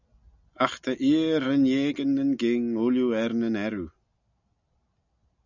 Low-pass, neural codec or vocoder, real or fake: 7.2 kHz; none; real